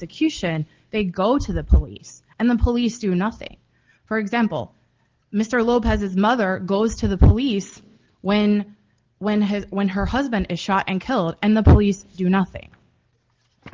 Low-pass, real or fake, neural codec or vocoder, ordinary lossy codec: 7.2 kHz; real; none; Opus, 16 kbps